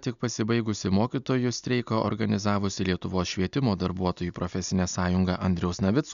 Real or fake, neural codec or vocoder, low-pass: real; none; 7.2 kHz